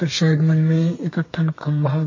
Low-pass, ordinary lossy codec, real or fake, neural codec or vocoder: 7.2 kHz; MP3, 32 kbps; fake; codec, 32 kHz, 1.9 kbps, SNAC